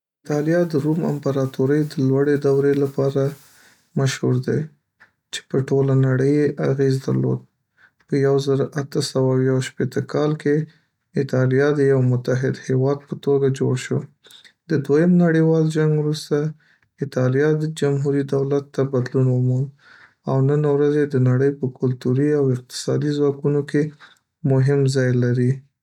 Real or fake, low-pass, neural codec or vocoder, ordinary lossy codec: real; 19.8 kHz; none; none